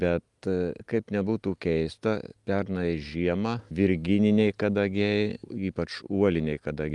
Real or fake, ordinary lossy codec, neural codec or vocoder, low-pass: real; Opus, 32 kbps; none; 10.8 kHz